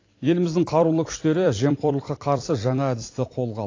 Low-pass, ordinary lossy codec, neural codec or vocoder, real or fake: 7.2 kHz; AAC, 32 kbps; vocoder, 44.1 kHz, 128 mel bands every 256 samples, BigVGAN v2; fake